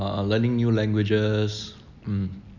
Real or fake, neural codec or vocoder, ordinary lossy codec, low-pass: real; none; none; 7.2 kHz